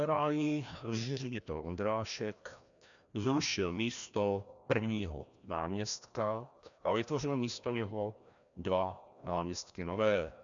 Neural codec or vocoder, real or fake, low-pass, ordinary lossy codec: codec, 16 kHz, 1 kbps, FreqCodec, larger model; fake; 7.2 kHz; MP3, 96 kbps